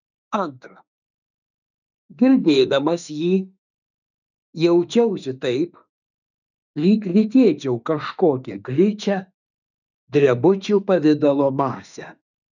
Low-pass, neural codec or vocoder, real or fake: 7.2 kHz; autoencoder, 48 kHz, 32 numbers a frame, DAC-VAE, trained on Japanese speech; fake